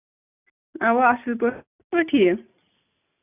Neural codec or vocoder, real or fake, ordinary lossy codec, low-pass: none; real; none; 3.6 kHz